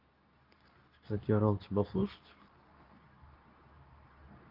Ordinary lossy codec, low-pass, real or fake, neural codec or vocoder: Opus, 32 kbps; 5.4 kHz; fake; codec, 24 kHz, 0.9 kbps, WavTokenizer, medium speech release version 2